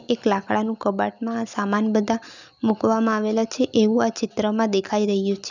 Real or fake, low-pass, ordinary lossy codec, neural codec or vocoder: real; 7.2 kHz; none; none